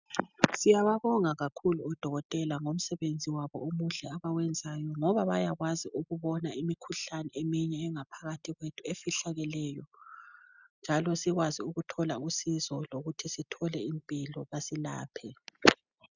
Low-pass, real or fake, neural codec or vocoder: 7.2 kHz; real; none